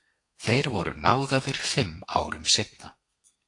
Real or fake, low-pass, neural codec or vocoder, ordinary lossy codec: fake; 10.8 kHz; codec, 32 kHz, 1.9 kbps, SNAC; AAC, 48 kbps